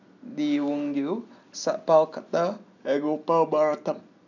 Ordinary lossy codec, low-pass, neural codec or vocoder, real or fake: none; 7.2 kHz; none; real